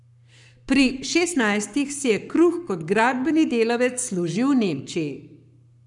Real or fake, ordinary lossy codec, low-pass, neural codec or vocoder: fake; none; 10.8 kHz; codec, 44.1 kHz, 7.8 kbps, Pupu-Codec